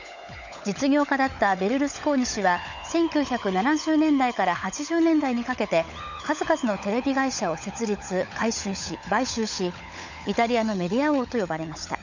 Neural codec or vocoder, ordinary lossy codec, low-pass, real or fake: codec, 16 kHz, 16 kbps, FunCodec, trained on LibriTTS, 50 frames a second; none; 7.2 kHz; fake